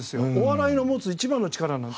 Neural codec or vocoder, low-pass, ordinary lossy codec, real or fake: none; none; none; real